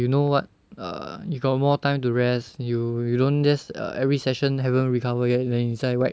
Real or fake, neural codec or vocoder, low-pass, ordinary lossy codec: real; none; none; none